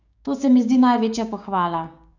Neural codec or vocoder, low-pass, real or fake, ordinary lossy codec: codec, 16 kHz, 6 kbps, DAC; 7.2 kHz; fake; none